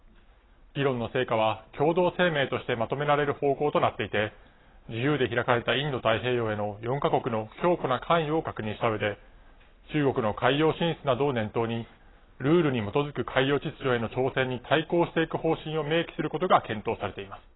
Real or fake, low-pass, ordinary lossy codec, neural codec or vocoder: real; 7.2 kHz; AAC, 16 kbps; none